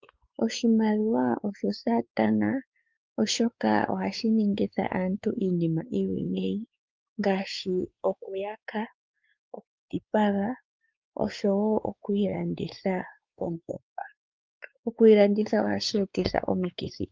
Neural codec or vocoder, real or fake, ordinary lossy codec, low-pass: codec, 16 kHz, 4 kbps, X-Codec, WavLM features, trained on Multilingual LibriSpeech; fake; Opus, 24 kbps; 7.2 kHz